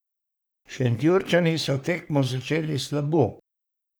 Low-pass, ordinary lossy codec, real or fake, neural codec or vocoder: none; none; fake; codec, 44.1 kHz, 3.4 kbps, Pupu-Codec